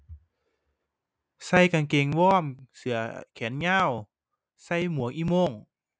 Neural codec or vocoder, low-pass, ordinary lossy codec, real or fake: none; none; none; real